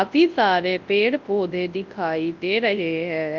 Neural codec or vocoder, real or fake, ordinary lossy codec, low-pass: codec, 24 kHz, 0.9 kbps, WavTokenizer, large speech release; fake; Opus, 24 kbps; 7.2 kHz